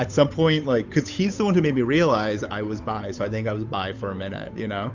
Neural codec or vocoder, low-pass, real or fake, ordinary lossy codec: codec, 16 kHz, 8 kbps, FunCodec, trained on Chinese and English, 25 frames a second; 7.2 kHz; fake; Opus, 64 kbps